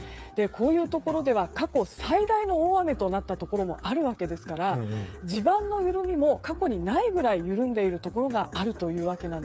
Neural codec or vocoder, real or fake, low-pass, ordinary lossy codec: codec, 16 kHz, 8 kbps, FreqCodec, smaller model; fake; none; none